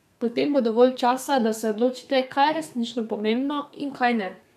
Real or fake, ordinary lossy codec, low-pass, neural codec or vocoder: fake; none; 14.4 kHz; codec, 32 kHz, 1.9 kbps, SNAC